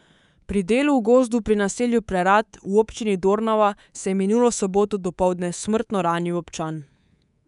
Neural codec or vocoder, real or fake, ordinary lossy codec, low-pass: codec, 24 kHz, 3.1 kbps, DualCodec; fake; none; 10.8 kHz